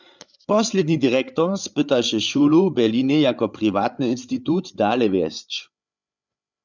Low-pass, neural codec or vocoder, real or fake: 7.2 kHz; codec, 16 kHz, 8 kbps, FreqCodec, larger model; fake